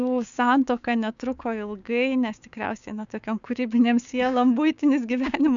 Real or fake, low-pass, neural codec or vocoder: fake; 7.2 kHz; codec, 16 kHz, 6 kbps, DAC